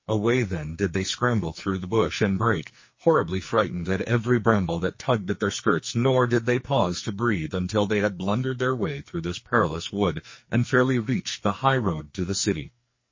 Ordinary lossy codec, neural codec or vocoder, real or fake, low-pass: MP3, 32 kbps; codec, 44.1 kHz, 2.6 kbps, SNAC; fake; 7.2 kHz